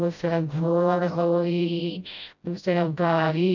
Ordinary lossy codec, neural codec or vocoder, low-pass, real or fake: none; codec, 16 kHz, 0.5 kbps, FreqCodec, smaller model; 7.2 kHz; fake